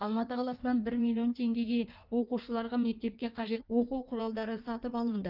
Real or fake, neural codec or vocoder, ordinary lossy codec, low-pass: fake; codec, 16 kHz in and 24 kHz out, 1.1 kbps, FireRedTTS-2 codec; Opus, 24 kbps; 5.4 kHz